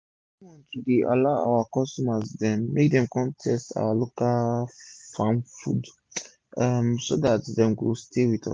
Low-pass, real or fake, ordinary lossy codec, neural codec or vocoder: 9.9 kHz; real; MP3, 96 kbps; none